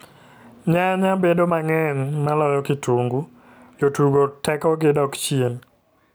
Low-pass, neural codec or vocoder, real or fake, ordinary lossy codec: none; none; real; none